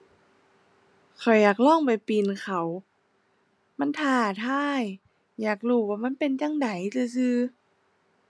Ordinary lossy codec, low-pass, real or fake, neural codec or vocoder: none; none; real; none